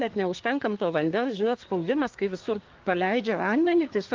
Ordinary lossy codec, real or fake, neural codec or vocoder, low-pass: Opus, 32 kbps; fake; codec, 24 kHz, 1 kbps, SNAC; 7.2 kHz